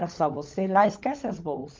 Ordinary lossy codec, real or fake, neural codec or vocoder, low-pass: Opus, 24 kbps; fake; codec, 16 kHz, 16 kbps, FunCodec, trained on LibriTTS, 50 frames a second; 7.2 kHz